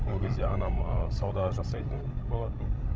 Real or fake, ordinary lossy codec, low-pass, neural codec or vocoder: fake; none; none; codec, 16 kHz, 16 kbps, FreqCodec, larger model